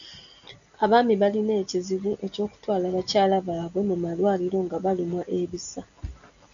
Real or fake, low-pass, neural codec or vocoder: real; 7.2 kHz; none